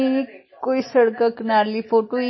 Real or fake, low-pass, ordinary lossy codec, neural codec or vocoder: real; 7.2 kHz; MP3, 24 kbps; none